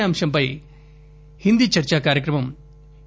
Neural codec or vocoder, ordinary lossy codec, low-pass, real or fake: none; none; none; real